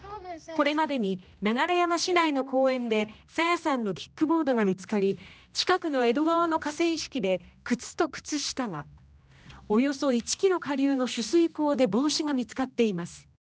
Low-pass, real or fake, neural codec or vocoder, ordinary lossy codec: none; fake; codec, 16 kHz, 1 kbps, X-Codec, HuBERT features, trained on general audio; none